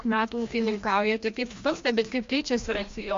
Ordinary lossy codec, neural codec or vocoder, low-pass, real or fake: MP3, 48 kbps; codec, 16 kHz, 1 kbps, FreqCodec, larger model; 7.2 kHz; fake